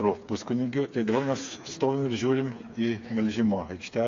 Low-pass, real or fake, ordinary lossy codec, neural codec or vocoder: 7.2 kHz; fake; AAC, 48 kbps; codec, 16 kHz, 4 kbps, FreqCodec, smaller model